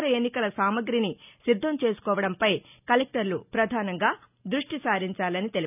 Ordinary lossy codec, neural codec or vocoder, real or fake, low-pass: none; none; real; 3.6 kHz